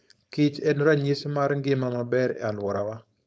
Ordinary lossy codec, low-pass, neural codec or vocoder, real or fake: none; none; codec, 16 kHz, 4.8 kbps, FACodec; fake